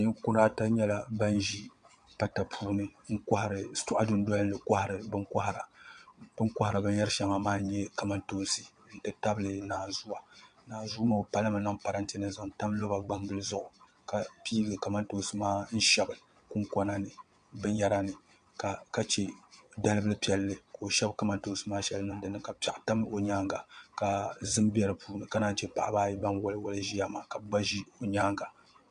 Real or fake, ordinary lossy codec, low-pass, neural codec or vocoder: fake; MP3, 64 kbps; 9.9 kHz; vocoder, 22.05 kHz, 80 mel bands, WaveNeXt